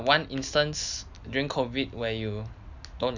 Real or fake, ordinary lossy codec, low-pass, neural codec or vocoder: real; none; 7.2 kHz; none